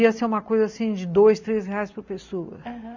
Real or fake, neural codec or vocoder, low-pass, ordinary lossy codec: real; none; 7.2 kHz; none